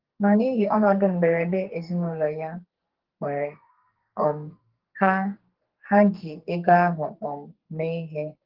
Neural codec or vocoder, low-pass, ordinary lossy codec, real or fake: codec, 44.1 kHz, 2.6 kbps, SNAC; 5.4 kHz; Opus, 16 kbps; fake